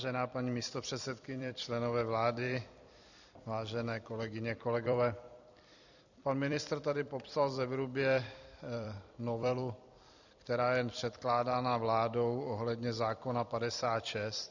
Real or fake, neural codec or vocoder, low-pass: fake; vocoder, 44.1 kHz, 128 mel bands every 256 samples, BigVGAN v2; 7.2 kHz